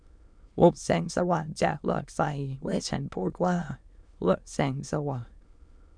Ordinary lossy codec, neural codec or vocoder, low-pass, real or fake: none; autoencoder, 22.05 kHz, a latent of 192 numbers a frame, VITS, trained on many speakers; 9.9 kHz; fake